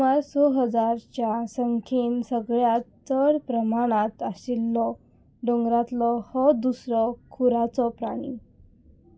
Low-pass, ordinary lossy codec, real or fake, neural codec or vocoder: none; none; real; none